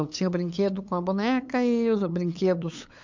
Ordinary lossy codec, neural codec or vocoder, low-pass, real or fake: MP3, 64 kbps; codec, 16 kHz, 8 kbps, FunCodec, trained on LibriTTS, 25 frames a second; 7.2 kHz; fake